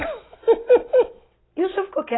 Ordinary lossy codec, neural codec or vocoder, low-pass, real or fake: AAC, 16 kbps; none; 7.2 kHz; real